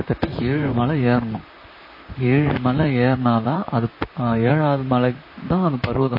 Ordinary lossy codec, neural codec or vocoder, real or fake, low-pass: MP3, 24 kbps; vocoder, 44.1 kHz, 128 mel bands, Pupu-Vocoder; fake; 5.4 kHz